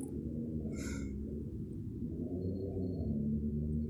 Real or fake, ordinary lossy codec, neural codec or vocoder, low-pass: real; none; none; 19.8 kHz